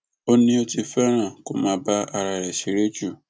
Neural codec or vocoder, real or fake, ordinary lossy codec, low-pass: none; real; none; none